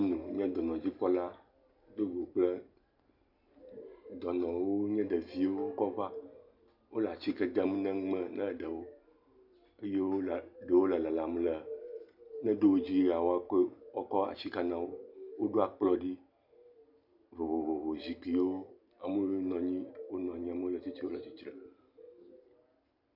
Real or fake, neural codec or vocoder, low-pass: real; none; 5.4 kHz